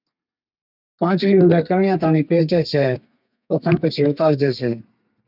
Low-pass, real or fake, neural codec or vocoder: 5.4 kHz; fake; codec, 32 kHz, 1.9 kbps, SNAC